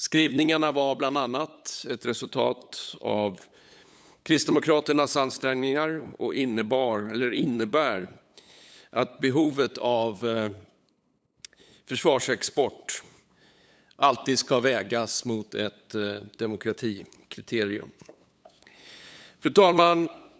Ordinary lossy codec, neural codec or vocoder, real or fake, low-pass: none; codec, 16 kHz, 8 kbps, FunCodec, trained on LibriTTS, 25 frames a second; fake; none